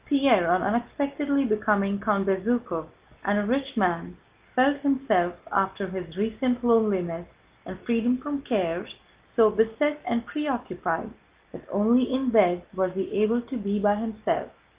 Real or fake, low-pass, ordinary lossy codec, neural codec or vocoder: real; 3.6 kHz; Opus, 32 kbps; none